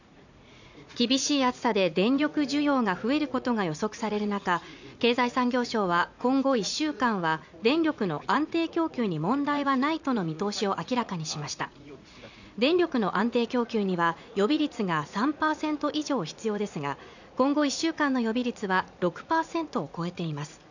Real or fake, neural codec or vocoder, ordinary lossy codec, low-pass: fake; vocoder, 44.1 kHz, 80 mel bands, Vocos; none; 7.2 kHz